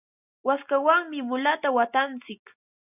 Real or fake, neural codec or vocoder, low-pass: real; none; 3.6 kHz